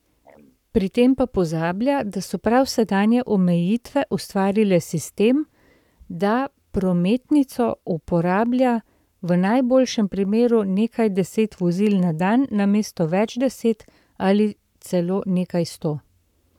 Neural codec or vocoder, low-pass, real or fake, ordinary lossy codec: codec, 44.1 kHz, 7.8 kbps, Pupu-Codec; 19.8 kHz; fake; none